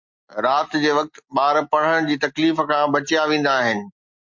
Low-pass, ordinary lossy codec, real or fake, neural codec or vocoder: 7.2 kHz; MP3, 48 kbps; real; none